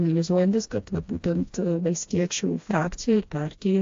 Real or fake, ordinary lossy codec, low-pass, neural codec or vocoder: fake; AAC, 48 kbps; 7.2 kHz; codec, 16 kHz, 1 kbps, FreqCodec, smaller model